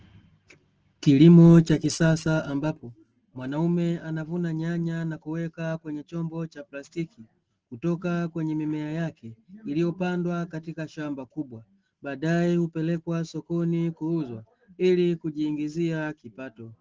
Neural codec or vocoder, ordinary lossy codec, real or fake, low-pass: none; Opus, 16 kbps; real; 7.2 kHz